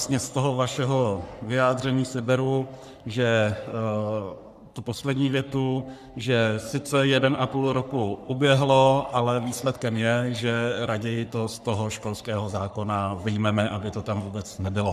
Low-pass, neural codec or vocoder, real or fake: 14.4 kHz; codec, 44.1 kHz, 3.4 kbps, Pupu-Codec; fake